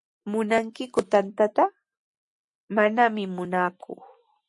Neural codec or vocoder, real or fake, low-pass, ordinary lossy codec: none; real; 10.8 kHz; MP3, 48 kbps